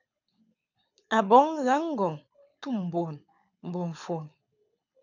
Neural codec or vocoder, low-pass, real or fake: codec, 24 kHz, 6 kbps, HILCodec; 7.2 kHz; fake